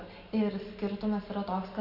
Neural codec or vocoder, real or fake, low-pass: none; real; 5.4 kHz